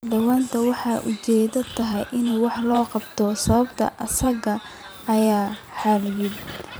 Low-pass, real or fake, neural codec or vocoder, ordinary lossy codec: none; fake; vocoder, 44.1 kHz, 128 mel bands every 512 samples, BigVGAN v2; none